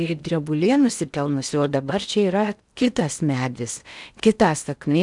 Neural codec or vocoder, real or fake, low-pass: codec, 16 kHz in and 24 kHz out, 0.6 kbps, FocalCodec, streaming, 2048 codes; fake; 10.8 kHz